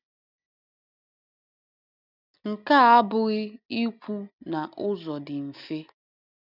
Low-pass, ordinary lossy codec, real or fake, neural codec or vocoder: 5.4 kHz; none; real; none